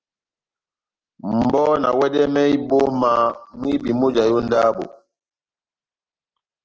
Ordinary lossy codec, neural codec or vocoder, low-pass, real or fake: Opus, 16 kbps; none; 7.2 kHz; real